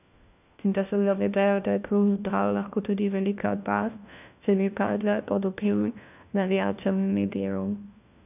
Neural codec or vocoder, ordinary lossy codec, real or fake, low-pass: codec, 16 kHz, 1 kbps, FunCodec, trained on LibriTTS, 50 frames a second; none; fake; 3.6 kHz